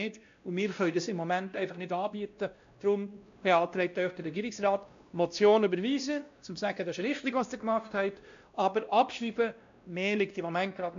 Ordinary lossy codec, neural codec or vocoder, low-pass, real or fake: AAC, 64 kbps; codec, 16 kHz, 1 kbps, X-Codec, WavLM features, trained on Multilingual LibriSpeech; 7.2 kHz; fake